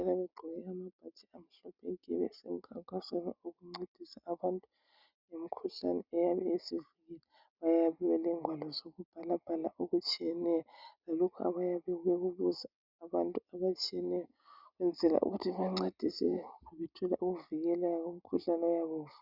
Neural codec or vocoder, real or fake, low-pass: none; real; 5.4 kHz